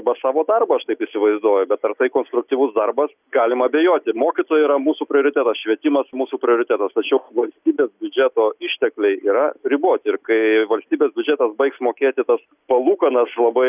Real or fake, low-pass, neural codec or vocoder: real; 3.6 kHz; none